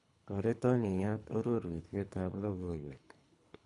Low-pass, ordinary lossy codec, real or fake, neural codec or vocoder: 10.8 kHz; none; fake; codec, 24 kHz, 3 kbps, HILCodec